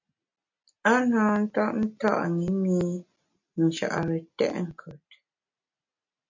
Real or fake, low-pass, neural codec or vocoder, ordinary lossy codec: real; 7.2 kHz; none; MP3, 48 kbps